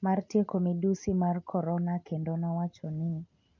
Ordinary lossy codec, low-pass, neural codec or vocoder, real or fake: MP3, 48 kbps; 7.2 kHz; none; real